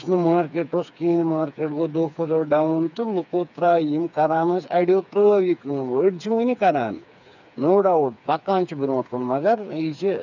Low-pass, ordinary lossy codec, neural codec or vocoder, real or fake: 7.2 kHz; none; codec, 16 kHz, 4 kbps, FreqCodec, smaller model; fake